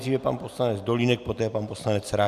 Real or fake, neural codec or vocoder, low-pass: real; none; 14.4 kHz